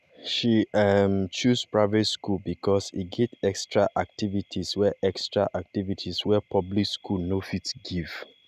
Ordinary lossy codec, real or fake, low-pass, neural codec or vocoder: none; real; 14.4 kHz; none